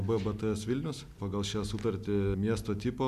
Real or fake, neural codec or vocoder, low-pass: real; none; 14.4 kHz